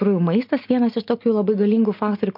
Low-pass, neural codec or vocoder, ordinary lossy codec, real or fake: 5.4 kHz; none; MP3, 48 kbps; real